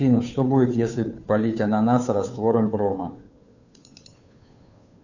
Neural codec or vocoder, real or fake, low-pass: codec, 16 kHz, 2 kbps, FunCodec, trained on Chinese and English, 25 frames a second; fake; 7.2 kHz